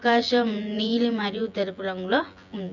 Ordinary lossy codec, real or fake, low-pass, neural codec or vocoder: none; fake; 7.2 kHz; vocoder, 24 kHz, 100 mel bands, Vocos